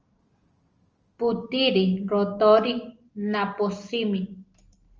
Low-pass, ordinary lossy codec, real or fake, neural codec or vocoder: 7.2 kHz; Opus, 24 kbps; real; none